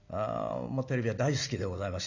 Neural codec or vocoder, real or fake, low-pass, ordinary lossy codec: none; real; 7.2 kHz; none